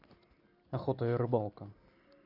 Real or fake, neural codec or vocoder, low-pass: real; none; 5.4 kHz